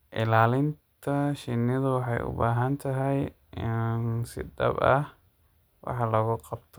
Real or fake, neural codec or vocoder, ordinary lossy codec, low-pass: real; none; none; none